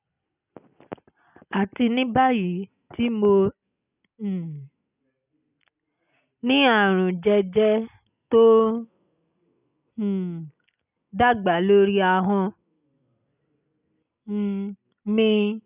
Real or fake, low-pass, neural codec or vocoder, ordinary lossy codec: real; 3.6 kHz; none; none